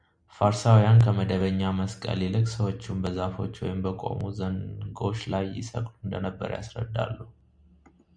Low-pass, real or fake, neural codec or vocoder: 9.9 kHz; real; none